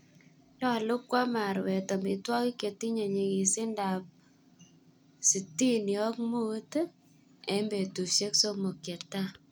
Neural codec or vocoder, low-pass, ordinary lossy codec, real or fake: none; none; none; real